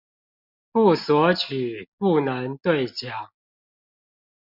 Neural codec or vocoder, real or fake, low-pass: none; real; 5.4 kHz